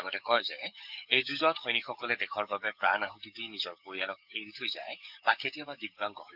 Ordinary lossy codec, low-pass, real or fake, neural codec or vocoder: none; 5.4 kHz; fake; codec, 44.1 kHz, 7.8 kbps, DAC